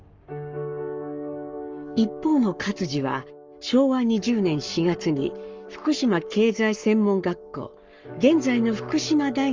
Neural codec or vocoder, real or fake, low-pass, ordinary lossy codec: codec, 44.1 kHz, 7.8 kbps, Pupu-Codec; fake; 7.2 kHz; Opus, 32 kbps